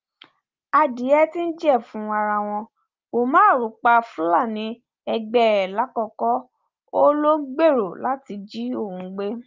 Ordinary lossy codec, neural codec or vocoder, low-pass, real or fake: Opus, 24 kbps; none; 7.2 kHz; real